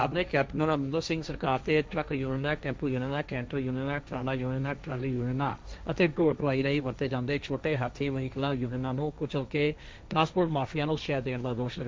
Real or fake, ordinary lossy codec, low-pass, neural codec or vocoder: fake; none; none; codec, 16 kHz, 1.1 kbps, Voila-Tokenizer